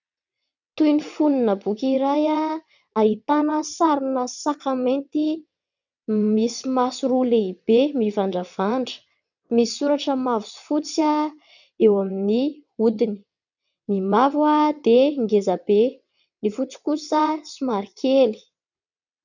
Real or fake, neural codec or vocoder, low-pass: fake; vocoder, 22.05 kHz, 80 mel bands, WaveNeXt; 7.2 kHz